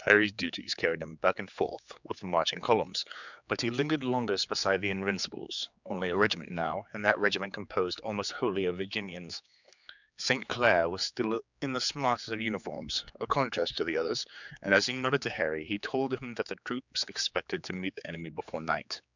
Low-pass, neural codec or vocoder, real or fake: 7.2 kHz; codec, 16 kHz, 4 kbps, X-Codec, HuBERT features, trained on general audio; fake